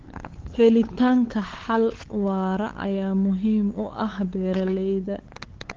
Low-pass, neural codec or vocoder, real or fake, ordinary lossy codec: 7.2 kHz; codec, 16 kHz, 8 kbps, FunCodec, trained on LibriTTS, 25 frames a second; fake; Opus, 16 kbps